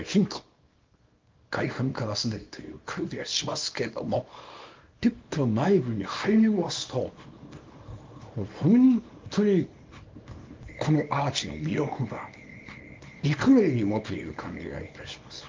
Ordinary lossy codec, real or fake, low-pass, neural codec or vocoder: Opus, 24 kbps; fake; 7.2 kHz; codec, 24 kHz, 0.9 kbps, WavTokenizer, small release